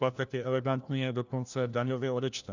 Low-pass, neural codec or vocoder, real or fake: 7.2 kHz; codec, 16 kHz, 1 kbps, FunCodec, trained on LibriTTS, 50 frames a second; fake